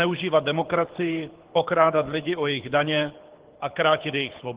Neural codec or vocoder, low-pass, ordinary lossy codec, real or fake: codec, 24 kHz, 6 kbps, HILCodec; 3.6 kHz; Opus, 16 kbps; fake